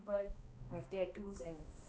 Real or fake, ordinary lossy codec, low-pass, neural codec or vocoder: fake; none; none; codec, 16 kHz, 1 kbps, X-Codec, HuBERT features, trained on balanced general audio